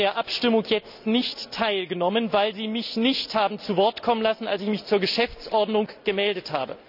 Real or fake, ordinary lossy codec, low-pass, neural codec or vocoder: real; none; 5.4 kHz; none